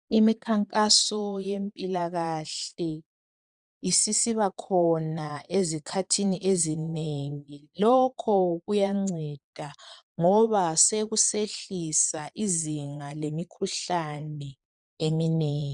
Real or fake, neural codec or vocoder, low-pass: fake; vocoder, 22.05 kHz, 80 mel bands, Vocos; 9.9 kHz